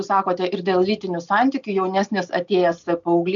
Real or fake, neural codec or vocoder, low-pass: real; none; 7.2 kHz